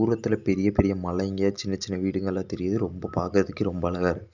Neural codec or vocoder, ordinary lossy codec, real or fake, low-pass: none; none; real; 7.2 kHz